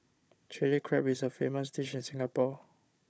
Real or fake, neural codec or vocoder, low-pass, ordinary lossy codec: fake; codec, 16 kHz, 16 kbps, FunCodec, trained on Chinese and English, 50 frames a second; none; none